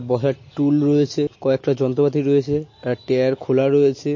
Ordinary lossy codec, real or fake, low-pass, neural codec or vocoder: MP3, 32 kbps; real; 7.2 kHz; none